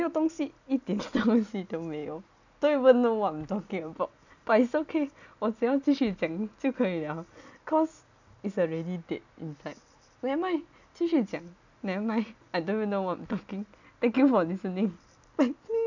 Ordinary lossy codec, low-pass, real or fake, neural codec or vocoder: none; 7.2 kHz; real; none